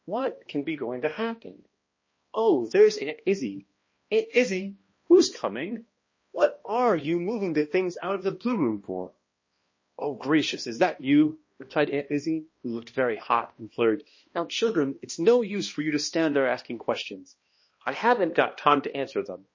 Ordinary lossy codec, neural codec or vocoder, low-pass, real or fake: MP3, 32 kbps; codec, 16 kHz, 1 kbps, X-Codec, HuBERT features, trained on balanced general audio; 7.2 kHz; fake